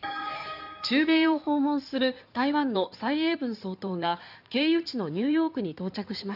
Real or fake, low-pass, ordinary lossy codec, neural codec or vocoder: fake; 5.4 kHz; none; codec, 16 kHz in and 24 kHz out, 2.2 kbps, FireRedTTS-2 codec